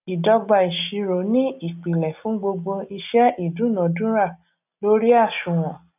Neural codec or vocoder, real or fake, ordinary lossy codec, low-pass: none; real; none; 3.6 kHz